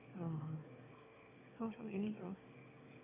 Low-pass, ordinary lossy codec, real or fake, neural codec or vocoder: 3.6 kHz; MP3, 32 kbps; fake; autoencoder, 22.05 kHz, a latent of 192 numbers a frame, VITS, trained on one speaker